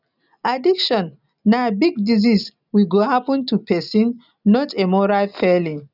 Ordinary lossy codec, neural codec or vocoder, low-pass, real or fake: none; none; 5.4 kHz; real